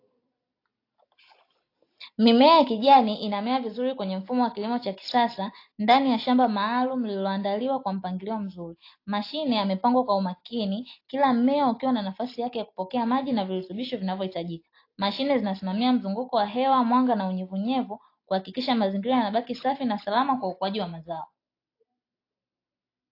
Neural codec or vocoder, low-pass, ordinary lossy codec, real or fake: none; 5.4 kHz; AAC, 32 kbps; real